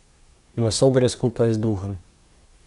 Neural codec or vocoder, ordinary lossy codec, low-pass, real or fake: codec, 24 kHz, 1 kbps, SNAC; none; 10.8 kHz; fake